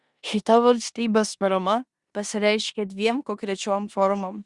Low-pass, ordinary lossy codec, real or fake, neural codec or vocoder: 10.8 kHz; Opus, 64 kbps; fake; codec, 16 kHz in and 24 kHz out, 0.9 kbps, LongCat-Audio-Codec, four codebook decoder